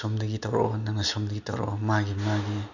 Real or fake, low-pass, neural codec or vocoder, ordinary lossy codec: real; 7.2 kHz; none; none